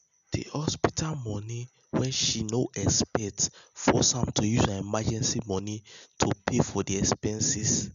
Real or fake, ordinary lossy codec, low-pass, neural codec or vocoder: real; none; 7.2 kHz; none